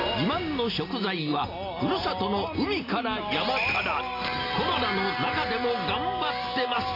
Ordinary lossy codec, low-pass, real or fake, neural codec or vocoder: AAC, 24 kbps; 5.4 kHz; real; none